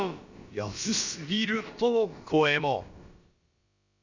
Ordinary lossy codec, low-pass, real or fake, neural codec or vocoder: Opus, 64 kbps; 7.2 kHz; fake; codec, 16 kHz, about 1 kbps, DyCAST, with the encoder's durations